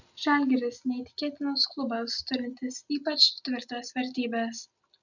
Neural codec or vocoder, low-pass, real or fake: none; 7.2 kHz; real